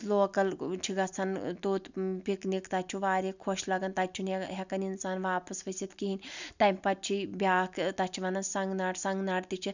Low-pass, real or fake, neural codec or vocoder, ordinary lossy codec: 7.2 kHz; real; none; none